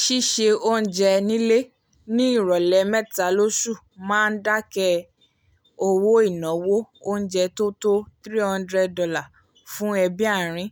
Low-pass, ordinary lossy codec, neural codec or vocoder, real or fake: none; none; none; real